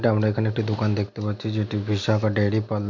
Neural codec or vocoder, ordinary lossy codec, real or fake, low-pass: none; MP3, 64 kbps; real; 7.2 kHz